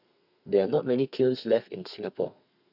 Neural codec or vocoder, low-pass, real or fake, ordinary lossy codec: codec, 32 kHz, 1.9 kbps, SNAC; 5.4 kHz; fake; none